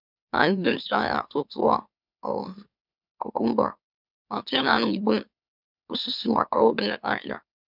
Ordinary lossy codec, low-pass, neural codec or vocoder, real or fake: none; 5.4 kHz; autoencoder, 44.1 kHz, a latent of 192 numbers a frame, MeloTTS; fake